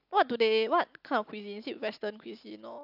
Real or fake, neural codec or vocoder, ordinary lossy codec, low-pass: real; none; AAC, 48 kbps; 5.4 kHz